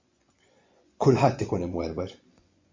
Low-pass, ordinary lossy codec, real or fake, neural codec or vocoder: 7.2 kHz; MP3, 48 kbps; fake; vocoder, 24 kHz, 100 mel bands, Vocos